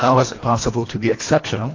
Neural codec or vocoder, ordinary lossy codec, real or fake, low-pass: codec, 24 kHz, 1.5 kbps, HILCodec; AAC, 32 kbps; fake; 7.2 kHz